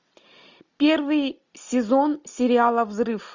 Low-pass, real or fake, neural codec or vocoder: 7.2 kHz; real; none